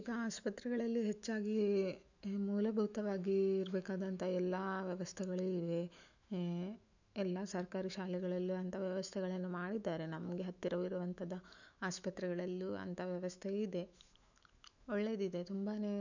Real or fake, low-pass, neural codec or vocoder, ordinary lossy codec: fake; 7.2 kHz; autoencoder, 48 kHz, 128 numbers a frame, DAC-VAE, trained on Japanese speech; none